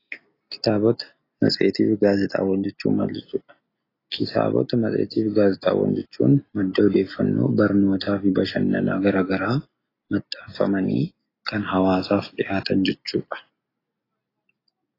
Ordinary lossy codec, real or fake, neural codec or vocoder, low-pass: AAC, 24 kbps; real; none; 5.4 kHz